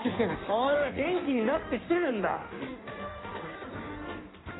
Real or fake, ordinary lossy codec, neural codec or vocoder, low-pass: fake; AAC, 16 kbps; codec, 16 kHz in and 24 kHz out, 1.1 kbps, FireRedTTS-2 codec; 7.2 kHz